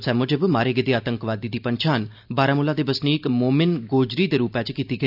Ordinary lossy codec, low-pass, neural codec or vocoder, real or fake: none; 5.4 kHz; none; real